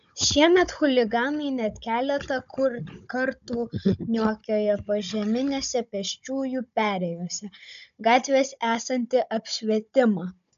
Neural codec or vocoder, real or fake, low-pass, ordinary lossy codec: codec, 16 kHz, 16 kbps, FunCodec, trained on Chinese and English, 50 frames a second; fake; 7.2 kHz; AAC, 96 kbps